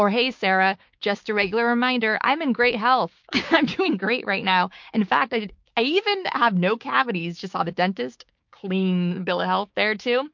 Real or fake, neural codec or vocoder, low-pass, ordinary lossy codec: fake; codec, 16 kHz, 6 kbps, DAC; 7.2 kHz; MP3, 48 kbps